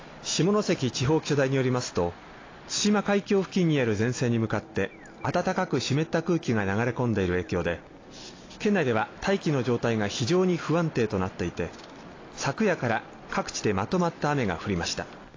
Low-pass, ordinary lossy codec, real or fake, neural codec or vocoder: 7.2 kHz; AAC, 32 kbps; real; none